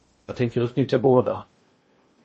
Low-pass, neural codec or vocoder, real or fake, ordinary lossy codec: 10.8 kHz; codec, 16 kHz in and 24 kHz out, 0.8 kbps, FocalCodec, streaming, 65536 codes; fake; MP3, 32 kbps